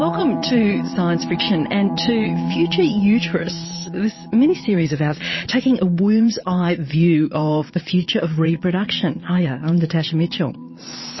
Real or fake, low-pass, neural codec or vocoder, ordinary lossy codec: fake; 7.2 kHz; vocoder, 22.05 kHz, 80 mel bands, Vocos; MP3, 24 kbps